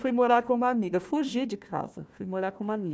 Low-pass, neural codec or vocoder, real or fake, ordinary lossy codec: none; codec, 16 kHz, 1 kbps, FunCodec, trained on Chinese and English, 50 frames a second; fake; none